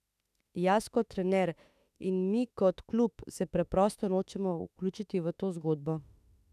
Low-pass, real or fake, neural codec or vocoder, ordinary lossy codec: 14.4 kHz; fake; autoencoder, 48 kHz, 32 numbers a frame, DAC-VAE, trained on Japanese speech; none